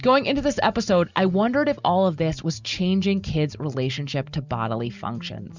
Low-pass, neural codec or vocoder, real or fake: 7.2 kHz; none; real